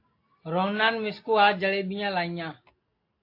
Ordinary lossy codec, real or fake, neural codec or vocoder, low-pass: AAC, 32 kbps; real; none; 5.4 kHz